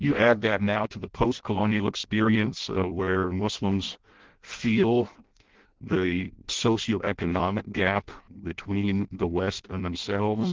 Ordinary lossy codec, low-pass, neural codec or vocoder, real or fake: Opus, 16 kbps; 7.2 kHz; codec, 16 kHz in and 24 kHz out, 0.6 kbps, FireRedTTS-2 codec; fake